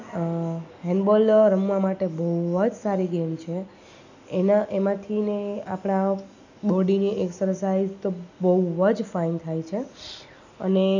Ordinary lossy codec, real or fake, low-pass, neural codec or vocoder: none; real; 7.2 kHz; none